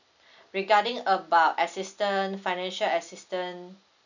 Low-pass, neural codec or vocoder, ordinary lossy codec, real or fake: 7.2 kHz; none; none; real